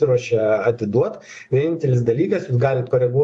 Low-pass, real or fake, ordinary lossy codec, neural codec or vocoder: 10.8 kHz; fake; AAC, 64 kbps; vocoder, 24 kHz, 100 mel bands, Vocos